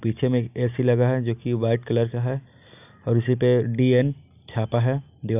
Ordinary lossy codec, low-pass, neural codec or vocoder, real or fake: none; 3.6 kHz; none; real